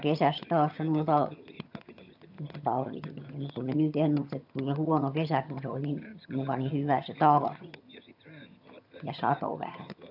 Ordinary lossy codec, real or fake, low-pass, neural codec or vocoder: AAC, 48 kbps; fake; 5.4 kHz; vocoder, 22.05 kHz, 80 mel bands, HiFi-GAN